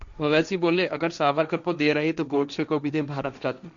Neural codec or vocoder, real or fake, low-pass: codec, 16 kHz, 1.1 kbps, Voila-Tokenizer; fake; 7.2 kHz